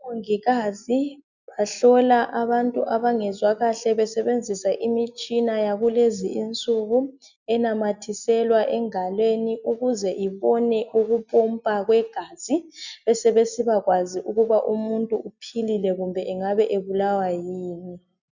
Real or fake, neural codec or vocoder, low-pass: real; none; 7.2 kHz